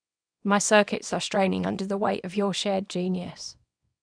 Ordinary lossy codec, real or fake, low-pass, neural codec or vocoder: none; fake; 9.9 kHz; codec, 24 kHz, 0.9 kbps, WavTokenizer, small release